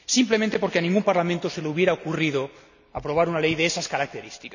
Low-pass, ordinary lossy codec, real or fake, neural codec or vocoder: 7.2 kHz; none; real; none